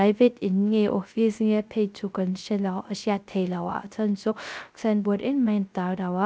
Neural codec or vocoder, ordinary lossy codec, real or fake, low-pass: codec, 16 kHz, 0.3 kbps, FocalCodec; none; fake; none